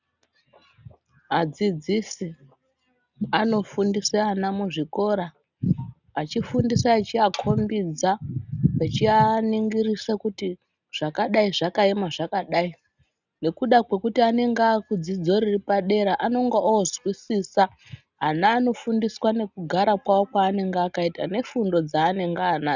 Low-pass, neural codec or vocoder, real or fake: 7.2 kHz; none; real